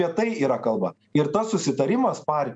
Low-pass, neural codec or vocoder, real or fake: 9.9 kHz; none; real